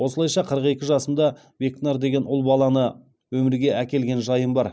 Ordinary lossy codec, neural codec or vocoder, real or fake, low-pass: none; none; real; none